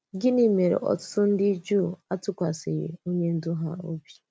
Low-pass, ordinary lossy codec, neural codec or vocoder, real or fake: none; none; none; real